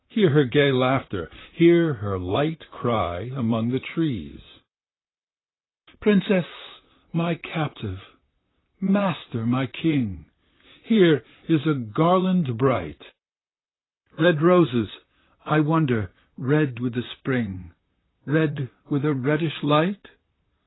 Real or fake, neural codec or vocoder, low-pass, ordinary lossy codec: fake; vocoder, 44.1 kHz, 128 mel bands, Pupu-Vocoder; 7.2 kHz; AAC, 16 kbps